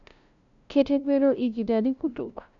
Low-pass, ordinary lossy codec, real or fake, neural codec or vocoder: 7.2 kHz; none; fake; codec, 16 kHz, 0.5 kbps, FunCodec, trained on LibriTTS, 25 frames a second